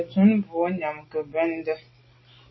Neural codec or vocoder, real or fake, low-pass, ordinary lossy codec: none; real; 7.2 kHz; MP3, 24 kbps